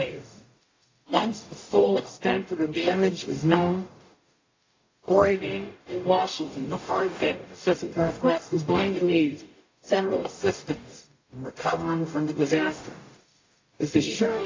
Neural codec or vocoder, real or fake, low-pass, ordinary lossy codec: codec, 44.1 kHz, 0.9 kbps, DAC; fake; 7.2 kHz; AAC, 32 kbps